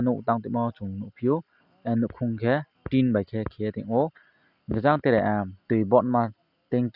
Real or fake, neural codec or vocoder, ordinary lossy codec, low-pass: real; none; none; 5.4 kHz